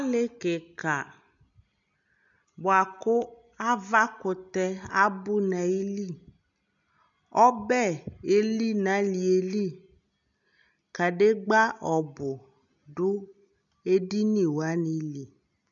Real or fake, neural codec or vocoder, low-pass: real; none; 7.2 kHz